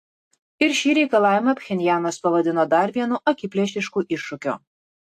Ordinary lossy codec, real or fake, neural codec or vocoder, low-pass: AAC, 64 kbps; real; none; 14.4 kHz